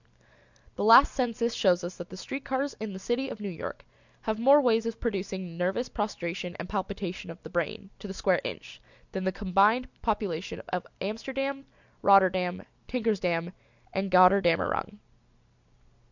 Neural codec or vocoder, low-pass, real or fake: none; 7.2 kHz; real